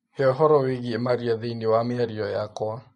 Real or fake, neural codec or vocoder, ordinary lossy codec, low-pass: real; none; MP3, 48 kbps; 14.4 kHz